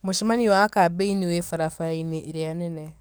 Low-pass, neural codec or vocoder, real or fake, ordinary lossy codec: none; codec, 44.1 kHz, 7.8 kbps, DAC; fake; none